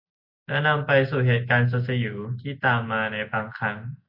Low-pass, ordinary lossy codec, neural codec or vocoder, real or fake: 5.4 kHz; Opus, 64 kbps; none; real